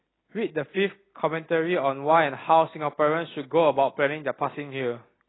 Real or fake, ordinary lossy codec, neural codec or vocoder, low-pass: real; AAC, 16 kbps; none; 7.2 kHz